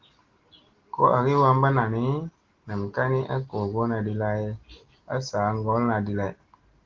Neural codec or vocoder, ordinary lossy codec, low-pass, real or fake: none; Opus, 16 kbps; 7.2 kHz; real